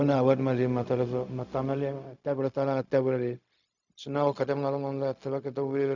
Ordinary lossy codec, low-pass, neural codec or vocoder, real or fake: none; 7.2 kHz; codec, 16 kHz, 0.4 kbps, LongCat-Audio-Codec; fake